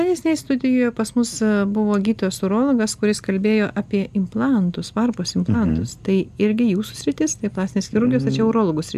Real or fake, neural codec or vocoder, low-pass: real; none; 14.4 kHz